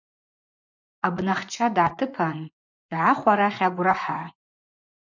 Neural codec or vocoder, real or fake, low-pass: vocoder, 24 kHz, 100 mel bands, Vocos; fake; 7.2 kHz